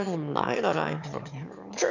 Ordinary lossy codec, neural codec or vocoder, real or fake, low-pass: none; autoencoder, 22.05 kHz, a latent of 192 numbers a frame, VITS, trained on one speaker; fake; 7.2 kHz